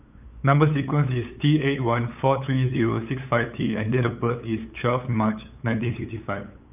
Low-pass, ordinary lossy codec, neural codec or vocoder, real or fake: 3.6 kHz; none; codec, 16 kHz, 8 kbps, FunCodec, trained on LibriTTS, 25 frames a second; fake